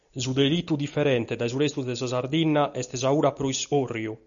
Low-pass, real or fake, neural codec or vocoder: 7.2 kHz; real; none